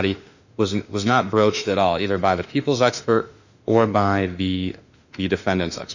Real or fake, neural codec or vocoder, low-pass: fake; autoencoder, 48 kHz, 32 numbers a frame, DAC-VAE, trained on Japanese speech; 7.2 kHz